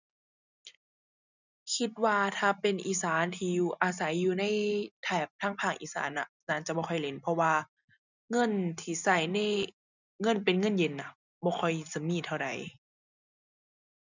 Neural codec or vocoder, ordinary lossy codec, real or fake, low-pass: none; none; real; 7.2 kHz